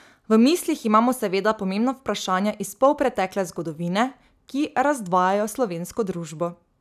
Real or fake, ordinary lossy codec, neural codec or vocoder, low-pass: real; none; none; 14.4 kHz